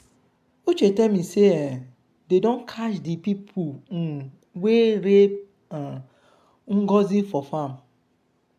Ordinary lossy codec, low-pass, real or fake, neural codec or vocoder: AAC, 96 kbps; 14.4 kHz; real; none